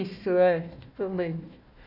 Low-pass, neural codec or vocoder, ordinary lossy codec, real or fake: 5.4 kHz; codec, 16 kHz, 1 kbps, FunCodec, trained on Chinese and English, 50 frames a second; none; fake